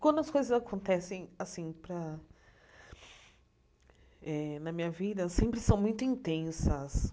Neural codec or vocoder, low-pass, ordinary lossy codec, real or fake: none; none; none; real